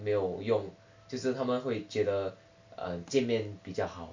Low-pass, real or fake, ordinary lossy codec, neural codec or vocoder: 7.2 kHz; real; MP3, 64 kbps; none